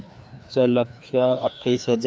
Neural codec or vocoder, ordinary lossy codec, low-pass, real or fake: codec, 16 kHz, 2 kbps, FreqCodec, larger model; none; none; fake